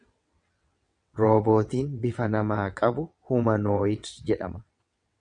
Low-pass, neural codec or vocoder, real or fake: 9.9 kHz; vocoder, 22.05 kHz, 80 mel bands, WaveNeXt; fake